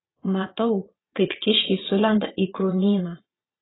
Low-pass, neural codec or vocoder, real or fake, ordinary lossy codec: 7.2 kHz; vocoder, 22.05 kHz, 80 mel bands, Vocos; fake; AAC, 16 kbps